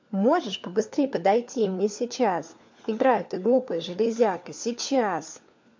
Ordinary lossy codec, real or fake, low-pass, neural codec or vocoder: MP3, 48 kbps; fake; 7.2 kHz; codec, 16 kHz, 4 kbps, FunCodec, trained on LibriTTS, 50 frames a second